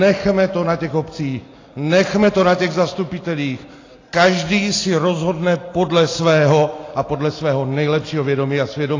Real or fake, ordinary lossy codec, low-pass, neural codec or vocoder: real; AAC, 32 kbps; 7.2 kHz; none